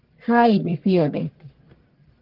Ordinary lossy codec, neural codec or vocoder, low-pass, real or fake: Opus, 16 kbps; codec, 44.1 kHz, 1.7 kbps, Pupu-Codec; 5.4 kHz; fake